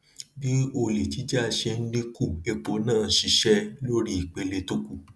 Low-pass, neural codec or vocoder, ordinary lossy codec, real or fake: none; none; none; real